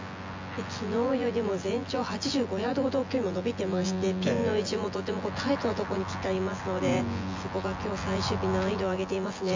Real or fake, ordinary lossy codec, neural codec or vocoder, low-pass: fake; MP3, 64 kbps; vocoder, 24 kHz, 100 mel bands, Vocos; 7.2 kHz